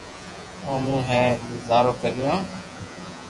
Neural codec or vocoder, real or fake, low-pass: vocoder, 48 kHz, 128 mel bands, Vocos; fake; 10.8 kHz